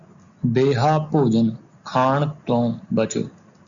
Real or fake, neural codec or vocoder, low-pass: real; none; 7.2 kHz